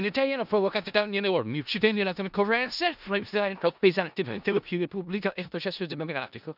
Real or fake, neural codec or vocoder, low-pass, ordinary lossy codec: fake; codec, 16 kHz in and 24 kHz out, 0.4 kbps, LongCat-Audio-Codec, four codebook decoder; 5.4 kHz; none